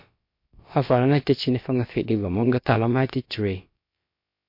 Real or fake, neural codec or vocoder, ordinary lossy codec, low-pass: fake; codec, 16 kHz, about 1 kbps, DyCAST, with the encoder's durations; MP3, 32 kbps; 5.4 kHz